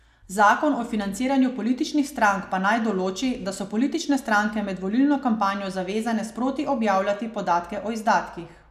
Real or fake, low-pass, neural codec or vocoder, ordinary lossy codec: real; 14.4 kHz; none; none